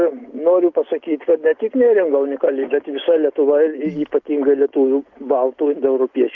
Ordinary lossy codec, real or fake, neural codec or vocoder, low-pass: Opus, 32 kbps; real; none; 7.2 kHz